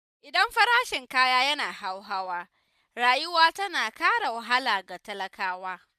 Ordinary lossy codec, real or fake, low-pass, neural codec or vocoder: none; real; 14.4 kHz; none